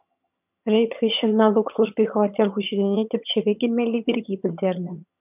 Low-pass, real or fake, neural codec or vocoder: 3.6 kHz; fake; vocoder, 22.05 kHz, 80 mel bands, HiFi-GAN